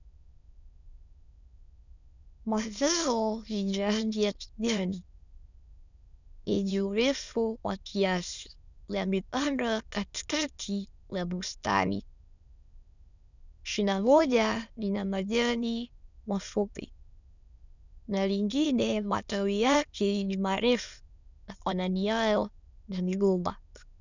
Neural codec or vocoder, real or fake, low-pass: autoencoder, 22.05 kHz, a latent of 192 numbers a frame, VITS, trained on many speakers; fake; 7.2 kHz